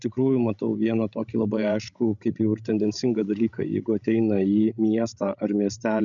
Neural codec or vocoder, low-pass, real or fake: codec, 16 kHz, 16 kbps, FreqCodec, larger model; 7.2 kHz; fake